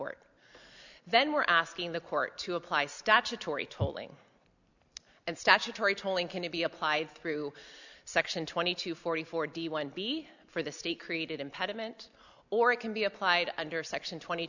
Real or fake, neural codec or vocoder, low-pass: real; none; 7.2 kHz